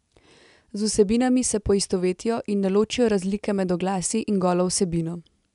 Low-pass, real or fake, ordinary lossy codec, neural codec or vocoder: 10.8 kHz; real; none; none